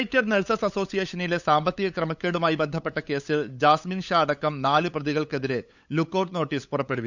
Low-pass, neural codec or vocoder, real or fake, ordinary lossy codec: 7.2 kHz; codec, 16 kHz, 8 kbps, FunCodec, trained on LibriTTS, 25 frames a second; fake; none